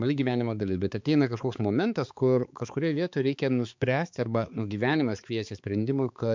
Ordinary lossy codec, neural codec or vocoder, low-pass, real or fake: MP3, 64 kbps; codec, 16 kHz, 4 kbps, X-Codec, HuBERT features, trained on balanced general audio; 7.2 kHz; fake